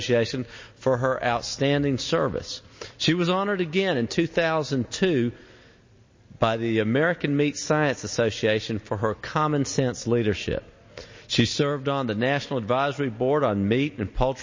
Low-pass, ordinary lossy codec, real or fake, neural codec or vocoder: 7.2 kHz; MP3, 32 kbps; real; none